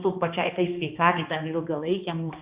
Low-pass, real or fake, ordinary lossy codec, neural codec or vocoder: 3.6 kHz; fake; Opus, 64 kbps; codec, 16 kHz, 2 kbps, X-Codec, HuBERT features, trained on balanced general audio